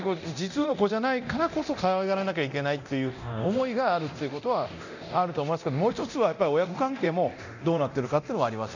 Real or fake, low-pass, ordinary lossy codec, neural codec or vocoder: fake; 7.2 kHz; none; codec, 24 kHz, 0.9 kbps, DualCodec